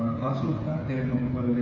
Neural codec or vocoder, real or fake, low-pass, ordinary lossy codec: codec, 16 kHz, 8 kbps, FreqCodec, smaller model; fake; 7.2 kHz; MP3, 32 kbps